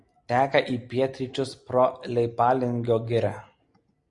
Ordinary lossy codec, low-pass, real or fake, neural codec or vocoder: Opus, 64 kbps; 10.8 kHz; real; none